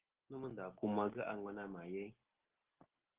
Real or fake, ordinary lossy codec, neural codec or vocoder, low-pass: real; Opus, 16 kbps; none; 3.6 kHz